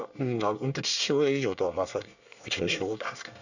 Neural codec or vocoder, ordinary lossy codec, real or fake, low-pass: codec, 24 kHz, 1 kbps, SNAC; none; fake; 7.2 kHz